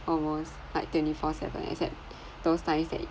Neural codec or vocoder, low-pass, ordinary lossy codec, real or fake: none; none; none; real